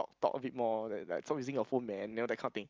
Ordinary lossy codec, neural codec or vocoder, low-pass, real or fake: Opus, 24 kbps; none; 7.2 kHz; real